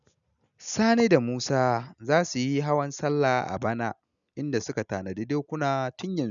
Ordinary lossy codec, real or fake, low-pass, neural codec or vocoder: none; real; 7.2 kHz; none